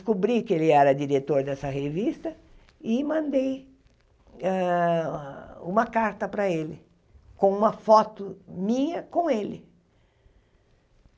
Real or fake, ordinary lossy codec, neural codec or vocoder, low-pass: real; none; none; none